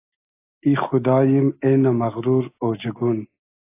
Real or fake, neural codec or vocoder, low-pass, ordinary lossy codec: real; none; 3.6 kHz; AAC, 24 kbps